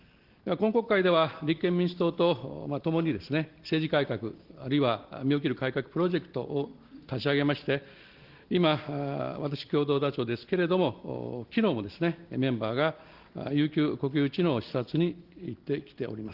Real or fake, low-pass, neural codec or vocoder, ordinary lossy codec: real; 5.4 kHz; none; Opus, 16 kbps